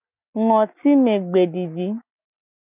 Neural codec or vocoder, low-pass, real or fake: none; 3.6 kHz; real